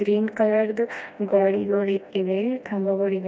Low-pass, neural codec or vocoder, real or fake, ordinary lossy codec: none; codec, 16 kHz, 1 kbps, FreqCodec, smaller model; fake; none